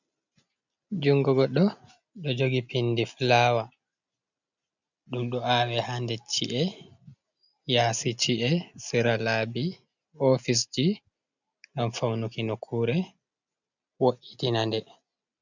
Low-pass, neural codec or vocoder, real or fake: 7.2 kHz; none; real